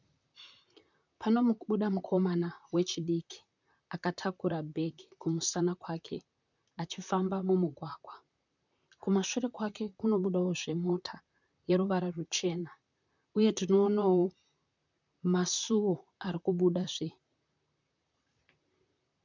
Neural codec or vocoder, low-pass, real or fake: vocoder, 44.1 kHz, 128 mel bands, Pupu-Vocoder; 7.2 kHz; fake